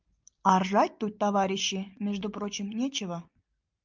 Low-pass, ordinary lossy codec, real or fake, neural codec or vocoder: 7.2 kHz; Opus, 24 kbps; real; none